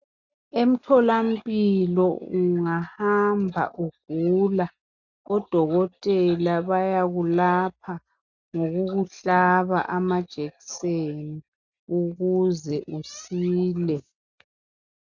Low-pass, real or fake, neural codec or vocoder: 7.2 kHz; real; none